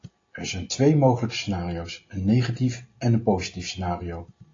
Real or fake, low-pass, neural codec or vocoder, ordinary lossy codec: real; 7.2 kHz; none; AAC, 32 kbps